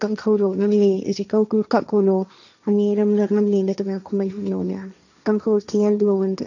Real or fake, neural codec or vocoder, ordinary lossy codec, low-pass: fake; codec, 16 kHz, 1.1 kbps, Voila-Tokenizer; none; 7.2 kHz